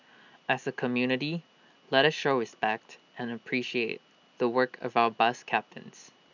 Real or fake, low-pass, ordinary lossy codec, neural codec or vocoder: real; 7.2 kHz; none; none